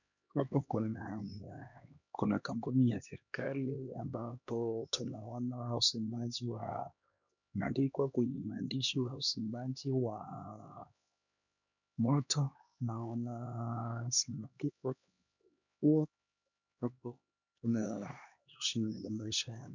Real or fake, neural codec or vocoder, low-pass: fake; codec, 16 kHz, 2 kbps, X-Codec, HuBERT features, trained on LibriSpeech; 7.2 kHz